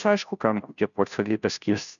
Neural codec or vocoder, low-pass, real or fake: codec, 16 kHz, 0.5 kbps, FunCodec, trained on Chinese and English, 25 frames a second; 7.2 kHz; fake